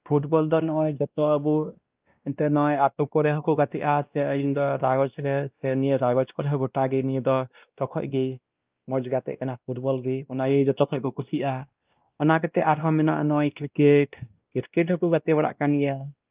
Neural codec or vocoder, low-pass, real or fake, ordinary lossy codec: codec, 16 kHz, 1 kbps, X-Codec, WavLM features, trained on Multilingual LibriSpeech; 3.6 kHz; fake; Opus, 24 kbps